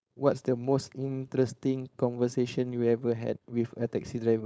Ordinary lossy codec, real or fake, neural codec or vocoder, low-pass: none; fake; codec, 16 kHz, 4.8 kbps, FACodec; none